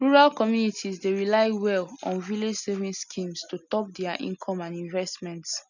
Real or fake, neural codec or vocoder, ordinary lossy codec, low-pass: real; none; none; 7.2 kHz